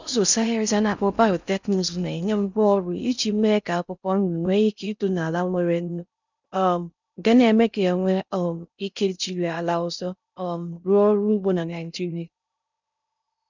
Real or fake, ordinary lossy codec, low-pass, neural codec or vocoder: fake; none; 7.2 kHz; codec, 16 kHz in and 24 kHz out, 0.6 kbps, FocalCodec, streaming, 4096 codes